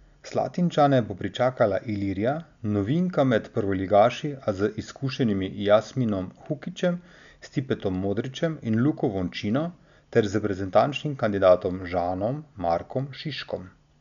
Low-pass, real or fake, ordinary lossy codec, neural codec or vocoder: 7.2 kHz; real; none; none